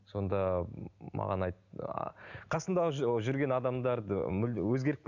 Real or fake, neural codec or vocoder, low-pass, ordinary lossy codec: real; none; 7.2 kHz; none